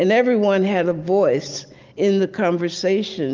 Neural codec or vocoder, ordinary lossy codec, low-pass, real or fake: none; Opus, 24 kbps; 7.2 kHz; real